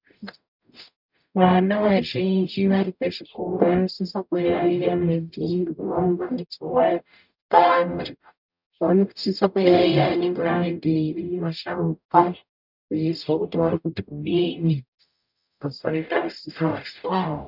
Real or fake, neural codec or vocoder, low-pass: fake; codec, 44.1 kHz, 0.9 kbps, DAC; 5.4 kHz